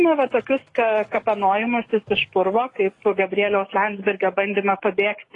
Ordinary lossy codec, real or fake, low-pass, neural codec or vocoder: AAC, 32 kbps; fake; 10.8 kHz; codec, 44.1 kHz, 7.8 kbps, DAC